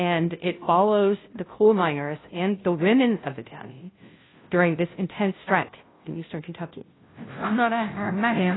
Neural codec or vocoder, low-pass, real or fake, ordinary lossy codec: codec, 16 kHz, 0.5 kbps, FunCodec, trained on Chinese and English, 25 frames a second; 7.2 kHz; fake; AAC, 16 kbps